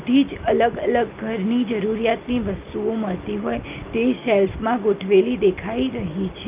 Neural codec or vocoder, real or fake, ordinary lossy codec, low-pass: none; real; Opus, 24 kbps; 3.6 kHz